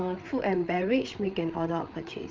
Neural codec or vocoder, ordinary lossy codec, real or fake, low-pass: codec, 16 kHz, 8 kbps, FreqCodec, larger model; Opus, 24 kbps; fake; 7.2 kHz